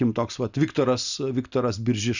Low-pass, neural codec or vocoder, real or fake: 7.2 kHz; none; real